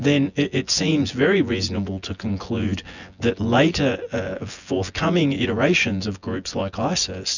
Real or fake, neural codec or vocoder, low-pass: fake; vocoder, 24 kHz, 100 mel bands, Vocos; 7.2 kHz